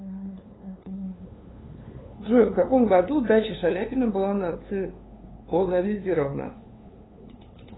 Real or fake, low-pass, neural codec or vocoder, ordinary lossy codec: fake; 7.2 kHz; codec, 16 kHz, 2 kbps, FunCodec, trained on LibriTTS, 25 frames a second; AAC, 16 kbps